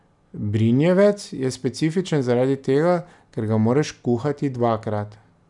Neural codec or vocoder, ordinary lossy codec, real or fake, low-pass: none; none; real; 10.8 kHz